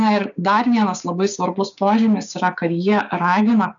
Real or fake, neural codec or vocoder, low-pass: fake; codec, 16 kHz, 6 kbps, DAC; 7.2 kHz